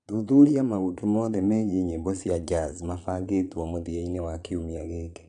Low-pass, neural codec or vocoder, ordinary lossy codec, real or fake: 9.9 kHz; vocoder, 22.05 kHz, 80 mel bands, Vocos; none; fake